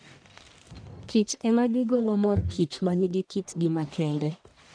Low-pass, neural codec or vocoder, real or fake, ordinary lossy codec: 9.9 kHz; codec, 44.1 kHz, 1.7 kbps, Pupu-Codec; fake; none